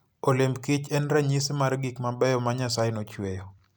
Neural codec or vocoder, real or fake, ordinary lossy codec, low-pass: none; real; none; none